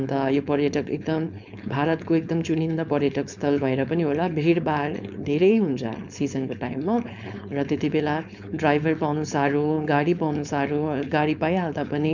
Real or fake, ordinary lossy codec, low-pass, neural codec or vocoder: fake; none; 7.2 kHz; codec, 16 kHz, 4.8 kbps, FACodec